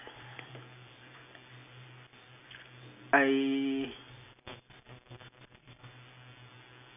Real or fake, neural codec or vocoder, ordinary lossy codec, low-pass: real; none; none; 3.6 kHz